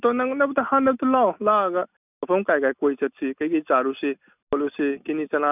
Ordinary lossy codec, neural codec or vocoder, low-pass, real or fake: none; none; 3.6 kHz; real